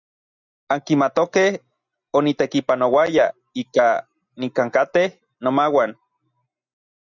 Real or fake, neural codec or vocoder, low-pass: real; none; 7.2 kHz